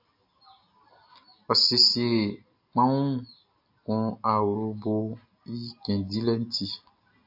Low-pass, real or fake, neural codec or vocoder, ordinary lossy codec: 5.4 kHz; real; none; AAC, 48 kbps